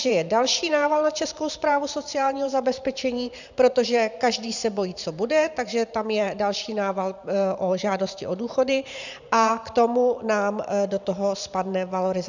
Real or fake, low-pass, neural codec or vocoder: fake; 7.2 kHz; vocoder, 44.1 kHz, 128 mel bands every 512 samples, BigVGAN v2